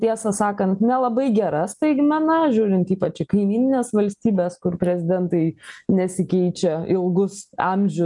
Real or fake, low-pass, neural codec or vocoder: real; 10.8 kHz; none